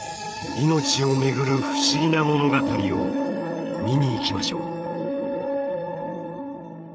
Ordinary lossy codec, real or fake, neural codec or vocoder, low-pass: none; fake; codec, 16 kHz, 8 kbps, FreqCodec, larger model; none